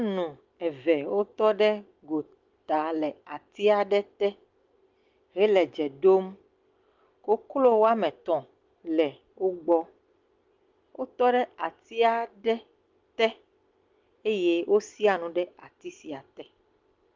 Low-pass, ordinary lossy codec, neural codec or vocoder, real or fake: 7.2 kHz; Opus, 24 kbps; none; real